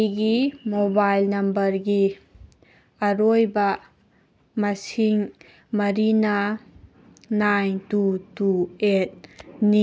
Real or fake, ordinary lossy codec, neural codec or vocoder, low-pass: real; none; none; none